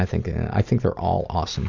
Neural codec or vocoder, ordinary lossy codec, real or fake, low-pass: none; Opus, 64 kbps; real; 7.2 kHz